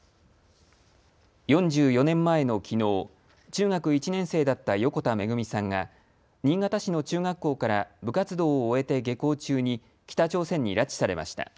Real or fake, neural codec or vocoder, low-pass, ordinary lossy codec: real; none; none; none